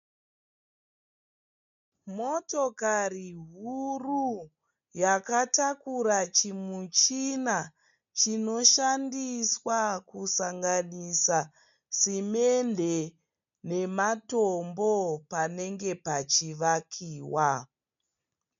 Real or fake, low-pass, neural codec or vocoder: real; 7.2 kHz; none